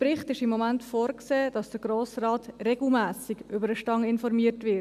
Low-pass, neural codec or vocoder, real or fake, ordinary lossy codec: 14.4 kHz; none; real; none